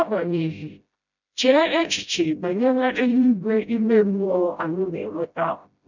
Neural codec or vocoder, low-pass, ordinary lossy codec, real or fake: codec, 16 kHz, 0.5 kbps, FreqCodec, smaller model; 7.2 kHz; none; fake